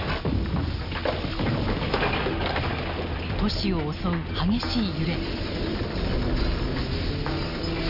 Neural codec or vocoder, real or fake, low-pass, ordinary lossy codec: none; real; 5.4 kHz; none